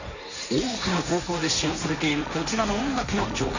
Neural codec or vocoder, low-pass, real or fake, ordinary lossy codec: codec, 16 kHz, 1.1 kbps, Voila-Tokenizer; 7.2 kHz; fake; none